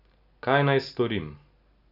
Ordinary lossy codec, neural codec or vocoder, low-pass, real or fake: none; none; 5.4 kHz; real